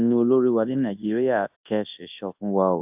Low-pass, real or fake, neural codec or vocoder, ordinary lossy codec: 3.6 kHz; fake; codec, 24 kHz, 0.9 kbps, WavTokenizer, large speech release; AAC, 32 kbps